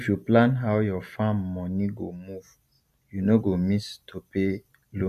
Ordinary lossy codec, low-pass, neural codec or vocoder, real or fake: none; 14.4 kHz; none; real